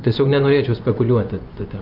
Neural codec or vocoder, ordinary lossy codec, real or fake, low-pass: vocoder, 24 kHz, 100 mel bands, Vocos; Opus, 24 kbps; fake; 5.4 kHz